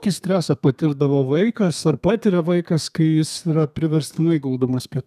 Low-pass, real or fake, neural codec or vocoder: 14.4 kHz; fake; codec, 32 kHz, 1.9 kbps, SNAC